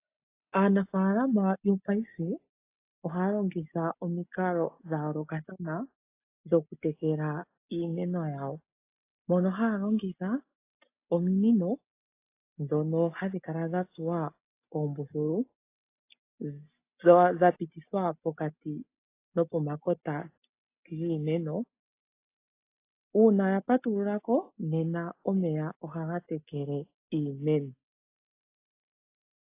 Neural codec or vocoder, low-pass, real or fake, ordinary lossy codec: none; 3.6 kHz; real; AAC, 24 kbps